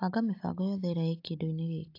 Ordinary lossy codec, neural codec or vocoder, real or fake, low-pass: AAC, 32 kbps; none; real; 5.4 kHz